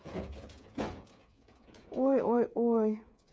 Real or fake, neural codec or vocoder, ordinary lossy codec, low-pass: fake; codec, 16 kHz, 8 kbps, FreqCodec, smaller model; none; none